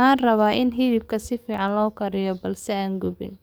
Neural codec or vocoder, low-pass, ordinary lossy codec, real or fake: codec, 44.1 kHz, 7.8 kbps, DAC; none; none; fake